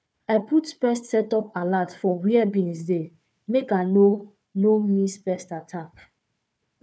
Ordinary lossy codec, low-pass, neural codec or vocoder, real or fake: none; none; codec, 16 kHz, 4 kbps, FunCodec, trained on Chinese and English, 50 frames a second; fake